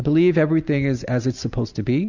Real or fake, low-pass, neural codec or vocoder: real; 7.2 kHz; none